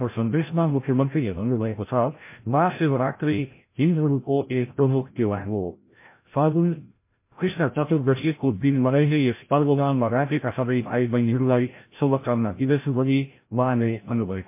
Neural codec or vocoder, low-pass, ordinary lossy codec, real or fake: codec, 16 kHz, 0.5 kbps, FreqCodec, larger model; 3.6 kHz; MP3, 24 kbps; fake